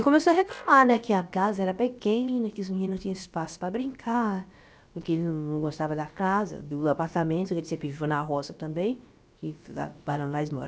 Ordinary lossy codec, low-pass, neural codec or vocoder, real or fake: none; none; codec, 16 kHz, about 1 kbps, DyCAST, with the encoder's durations; fake